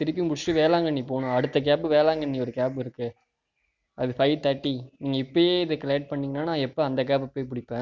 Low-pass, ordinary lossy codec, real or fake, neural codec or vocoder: 7.2 kHz; none; real; none